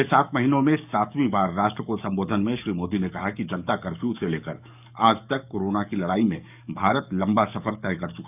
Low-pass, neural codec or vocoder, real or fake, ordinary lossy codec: 3.6 kHz; codec, 44.1 kHz, 7.8 kbps, DAC; fake; none